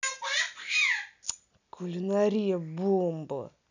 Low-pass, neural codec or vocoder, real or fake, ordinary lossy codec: 7.2 kHz; none; real; none